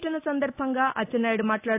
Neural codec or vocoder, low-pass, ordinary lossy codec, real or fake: none; 3.6 kHz; AAC, 24 kbps; real